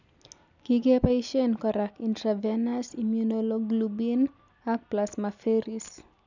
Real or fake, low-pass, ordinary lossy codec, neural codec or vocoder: real; 7.2 kHz; none; none